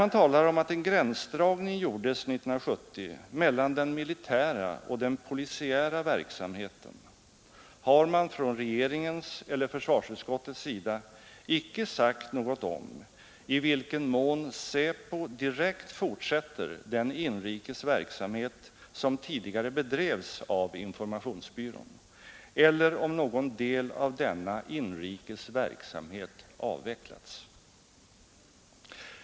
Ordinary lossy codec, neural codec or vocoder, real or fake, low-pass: none; none; real; none